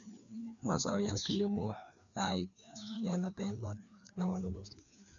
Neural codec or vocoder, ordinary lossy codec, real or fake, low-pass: codec, 16 kHz, 2 kbps, FreqCodec, larger model; none; fake; 7.2 kHz